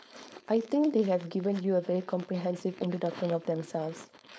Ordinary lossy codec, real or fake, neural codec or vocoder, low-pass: none; fake; codec, 16 kHz, 4.8 kbps, FACodec; none